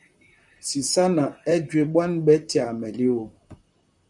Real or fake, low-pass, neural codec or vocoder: fake; 10.8 kHz; vocoder, 44.1 kHz, 128 mel bands, Pupu-Vocoder